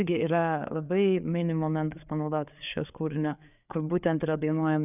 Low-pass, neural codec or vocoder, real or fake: 3.6 kHz; codec, 16 kHz, 4 kbps, FreqCodec, larger model; fake